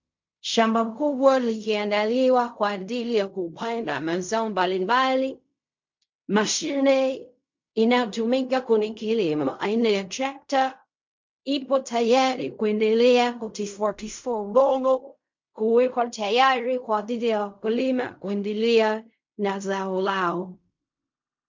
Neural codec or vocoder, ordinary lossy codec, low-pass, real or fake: codec, 16 kHz in and 24 kHz out, 0.4 kbps, LongCat-Audio-Codec, fine tuned four codebook decoder; MP3, 48 kbps; 7.2 kHz; fake